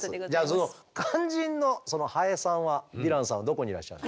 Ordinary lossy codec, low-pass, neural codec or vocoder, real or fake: none; none; none; real